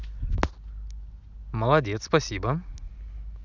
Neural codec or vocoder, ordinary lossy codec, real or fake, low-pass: none; none; real; 7.2 kHz